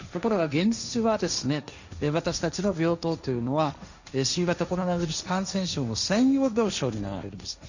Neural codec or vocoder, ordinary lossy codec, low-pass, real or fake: codec, 16 kHz, 1.1 kbps, Voila-Tokenizer; none; 7.2 kHz; fake